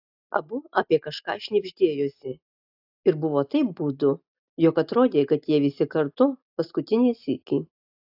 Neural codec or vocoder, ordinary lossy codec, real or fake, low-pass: none; AAC, 48 kbps; real; 5.4 kHz